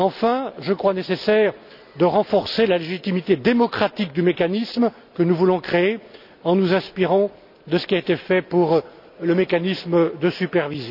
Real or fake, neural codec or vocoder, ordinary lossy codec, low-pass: real; none; none; 5.4 kHz